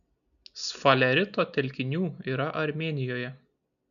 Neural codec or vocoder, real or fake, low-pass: none; real; 7.2 kHz